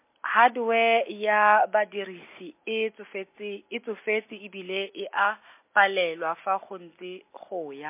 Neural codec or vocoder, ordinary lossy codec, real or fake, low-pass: none; MP3, 24 kbps; real; 3.6 kHz